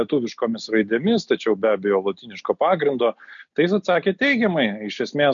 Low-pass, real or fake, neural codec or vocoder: 7.2 kHz; real; none